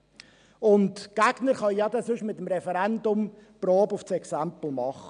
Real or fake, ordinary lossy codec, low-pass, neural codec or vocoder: real; MP3, 96 kbps; 9.9 kHz; none